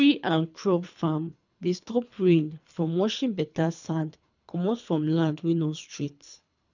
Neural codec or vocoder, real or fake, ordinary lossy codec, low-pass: codec, 24 kHz, 3 kbps, HILCodec; fake; none; 7.2 kHz